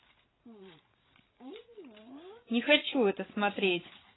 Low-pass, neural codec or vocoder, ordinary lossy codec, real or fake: 7.2 kHz; codec, 16 kHz, 8 kbps, FreqCodec, larger model; AAC, 16 kbps; fake